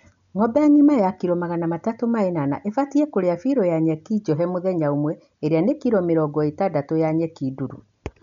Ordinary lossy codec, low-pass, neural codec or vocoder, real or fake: none; 7.2 kHz; none; real